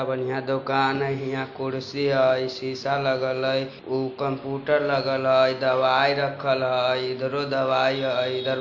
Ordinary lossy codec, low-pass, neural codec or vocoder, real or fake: MP3, 48 kbps; 7.2 kHz; none; real